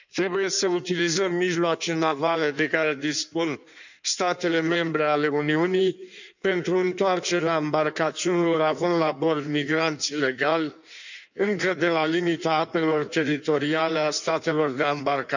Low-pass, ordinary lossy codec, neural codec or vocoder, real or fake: 7.2 kHz; none; codec, 16 kHz in and 24 kHz out, 1.1 kbps, FireRedTTS-2 codec; fake